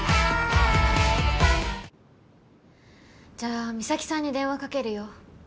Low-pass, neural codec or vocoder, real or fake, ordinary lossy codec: none; none; real; none